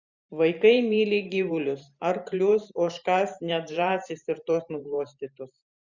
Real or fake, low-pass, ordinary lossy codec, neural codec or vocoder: fake; 7.2 kHz; Opus, 64 kbps; vocoder, 44.1 kHz, 128 mel bands every 512 samples, BigVGAN v2